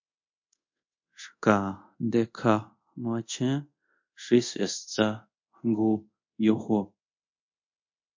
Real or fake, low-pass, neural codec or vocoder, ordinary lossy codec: fake; 7.2 kHz; codec, 24 kHz, 0.5 kbps, DualCodec; MP3, 48 kbps